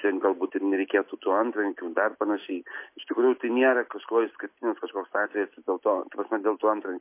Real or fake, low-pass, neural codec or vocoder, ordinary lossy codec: real; 3.6 kHz; none; MP3, 24 kbps